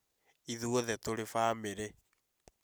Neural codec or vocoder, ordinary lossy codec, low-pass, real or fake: none; none; none; real